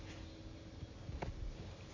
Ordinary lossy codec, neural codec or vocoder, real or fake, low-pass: MP3, 48 kbps; none; real; 7.2 kHz